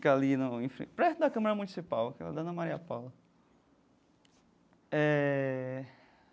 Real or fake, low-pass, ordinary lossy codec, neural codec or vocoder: real; none; none; none